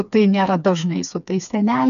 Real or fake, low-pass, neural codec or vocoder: fake; 7.2 kHz; codec, 16 kHz, 4 kbps, FreqCodec, smaller model